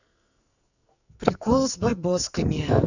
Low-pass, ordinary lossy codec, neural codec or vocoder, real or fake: 7.2 kHz; none; codec, 44.1 kHz, 2.6 kbps, SNAC; fake